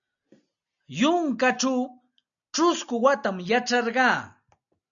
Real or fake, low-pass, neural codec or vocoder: real; 7.2 kHz; none